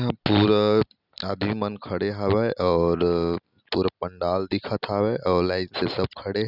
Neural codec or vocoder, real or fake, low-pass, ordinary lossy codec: none; real; 5.4 kHz; none